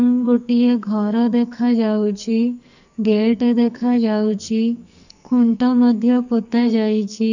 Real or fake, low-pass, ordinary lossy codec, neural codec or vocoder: fake; 7.2 kHz; none; codec, 44.1 kHz, 2.6 kbps, SNAC